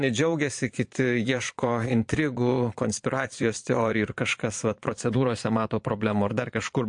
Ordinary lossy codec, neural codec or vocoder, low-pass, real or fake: MP3, 48 kbps; vocoder, 22.05 kHz, 80 mel bands, Vocos; 9.9 kHz; fake